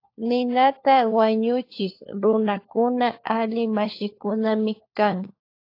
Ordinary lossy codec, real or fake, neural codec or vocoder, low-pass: AAC, 32 kbps; fake; codec, 16 kHz, 4 kbps, FunCodec, trained on LibriTTS, 50 frames a second; 5.4 kHz